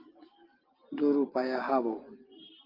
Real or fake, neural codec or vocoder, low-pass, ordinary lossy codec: real; none; 5.4 kHz; Opus, 24 kbps